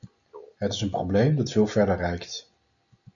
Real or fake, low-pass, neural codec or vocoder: real; 7.2 kHz; none